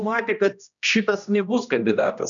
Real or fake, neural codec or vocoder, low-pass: fake; codec, 16 kHz, 1 kbps, X-Codec, HuBERT features, trained on general audio; 7.2 kHz